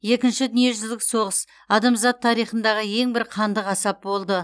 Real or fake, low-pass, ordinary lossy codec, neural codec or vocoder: real; none; none; none